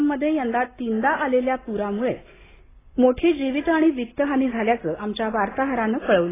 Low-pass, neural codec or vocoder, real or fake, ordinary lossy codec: 3.6 kHz; none; real; AAC, 16 kbps